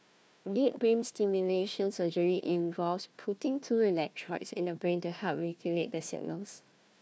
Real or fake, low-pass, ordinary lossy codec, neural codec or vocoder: fake; none; none; codec, 16 kHz, 1 kbps, FunCodec, trained on Chinese and English, 50 frames a second